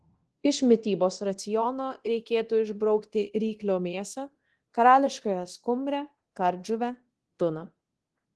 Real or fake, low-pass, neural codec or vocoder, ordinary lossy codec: fake; 10.8 kHz; codec, 24 kHz, 0.9 kbps, DualCodec; Opus, 16 kbps